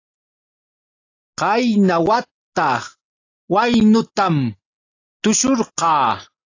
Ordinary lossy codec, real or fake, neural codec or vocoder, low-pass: AAC, 32 kbps; real; none; 7.2 kHz